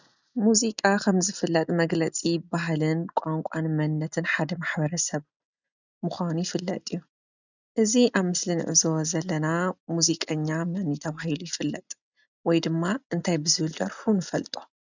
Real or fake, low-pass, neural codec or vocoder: real; 7.2 kHz; none